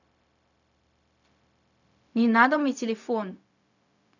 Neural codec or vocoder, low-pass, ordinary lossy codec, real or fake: codec, 16 kHz, 0.4 kbps, LongCat-Audio-Codec; 7.2 kHz; none; fake